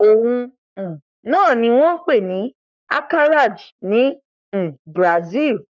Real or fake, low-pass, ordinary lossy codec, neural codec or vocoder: fake; 7.2 kHz; none; codec, 44.1 kHz, 3.4 kbps, Pupu-Codec